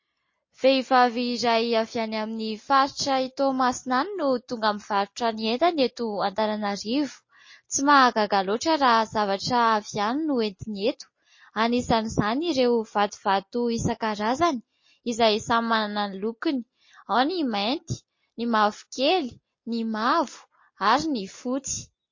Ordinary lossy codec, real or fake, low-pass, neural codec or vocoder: MP3, 32 kbps; real; 7.2 kHz; none